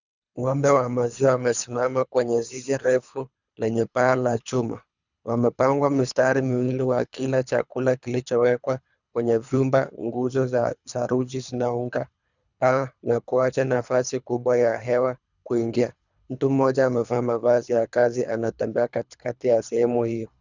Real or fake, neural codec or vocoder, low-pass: fake; codec, 24 kHz, 3 kbps, HILCodec; 7.2 kHz